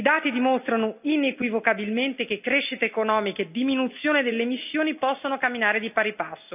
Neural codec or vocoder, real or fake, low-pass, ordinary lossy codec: none; real; 3.6 kHz; none